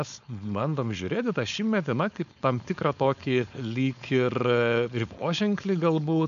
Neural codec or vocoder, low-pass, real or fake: codec, 16 kHz, 4.8 kbps, FACodec; 7.2 kHz; fake